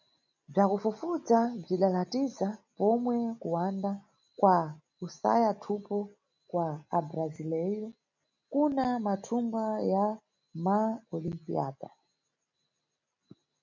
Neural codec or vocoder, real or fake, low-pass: none; real; 7.2 kHz